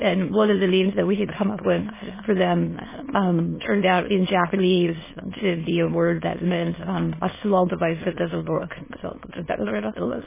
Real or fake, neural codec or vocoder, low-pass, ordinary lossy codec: fake; autoencoder, 22.05 kHz, a latent of 192 numbers a frame, VITS, trained on many speakers; 3.6 kHz; MP3, 16 kbps